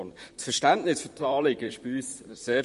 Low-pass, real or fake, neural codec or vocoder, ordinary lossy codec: 14.4 kHz; fake; vocoder, 44.1 kHz, 128 mel bands, Pupu-Vocoder; MP3, 48 kbps